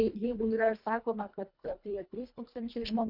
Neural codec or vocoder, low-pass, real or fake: codec, 24 kHz, 1.5 kbps, HILCodec; 5.4 kHz; fake